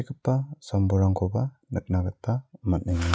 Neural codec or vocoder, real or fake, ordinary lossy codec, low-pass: none; real; none; none